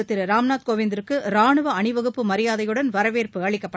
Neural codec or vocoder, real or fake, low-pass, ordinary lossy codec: none; real; none; none